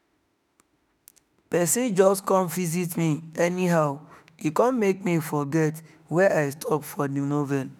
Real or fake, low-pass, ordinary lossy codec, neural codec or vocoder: fake; none; none; autoencoder, 48 kHz, 32 numbers a frame, DAC-VAE, trained on Japanese speech